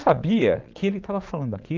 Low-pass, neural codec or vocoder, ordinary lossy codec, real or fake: 7.2 kHz; vocoder, 22.05 kHz, 80 mel bands, Vocos; Opus, 32 kbps; fake